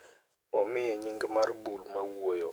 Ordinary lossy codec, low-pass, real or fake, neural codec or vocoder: none; none; fake; codec, 44.1 kHz, 7.8 kbps, DAC